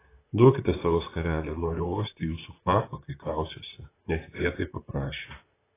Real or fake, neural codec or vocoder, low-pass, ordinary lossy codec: fake; vocoder, 44.1 kHz, 128 mel bands, Pupu-Vocoder; 3.6 kHz; AAC, 16 kbps